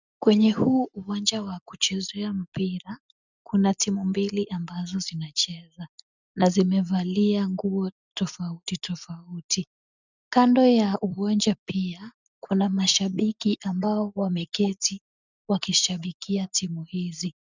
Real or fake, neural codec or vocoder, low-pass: real; none; 7.2 kHz